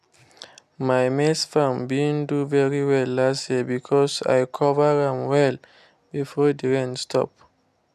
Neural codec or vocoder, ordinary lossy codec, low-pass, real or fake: none; none; 14.4 kHz; real